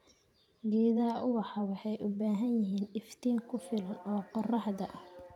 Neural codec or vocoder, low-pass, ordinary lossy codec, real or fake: vocoder, 44.1 kHz, 128 mel bands every 512 samples, BigVGAN v2; 19.8 kHz; none; fake